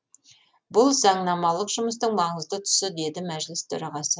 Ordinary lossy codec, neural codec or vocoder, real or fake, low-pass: none; none; real; none